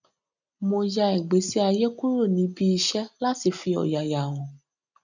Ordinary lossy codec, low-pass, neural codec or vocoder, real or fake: none; 7.2 kHz; none; real